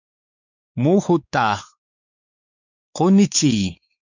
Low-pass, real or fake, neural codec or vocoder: 7.2 kHz; fake; codec, 16 kHz, 4 kbps, X-Codec, WavLM features, trained on Multilingual LibriSpeech